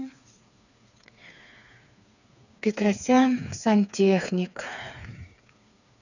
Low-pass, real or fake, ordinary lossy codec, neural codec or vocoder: 7.2 kHz; fake; none; codec, 16 kHz, 4 kbps, FreqCodec, smaller model